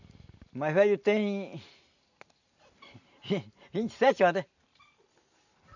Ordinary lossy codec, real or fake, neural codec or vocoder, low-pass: none; real; none; 7.2 kHz